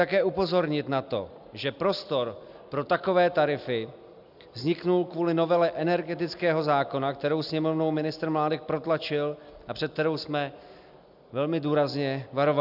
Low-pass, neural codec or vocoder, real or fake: 5.4 kHz; none; real